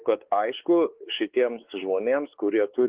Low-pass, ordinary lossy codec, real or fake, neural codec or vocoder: 3.6 kHz; Opus, 16 kbps; fake; codec, 16 kHz, 4 kbps, X-Codec, WavLM features, trained on Multilingual LibriSpeech